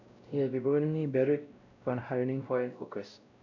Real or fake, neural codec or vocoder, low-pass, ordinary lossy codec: fake; codec, 16 kHz, 0.5 kbps, X-Codec, WavLM features, trained on Multilingual LibriSpeech; 7.2 kHz; none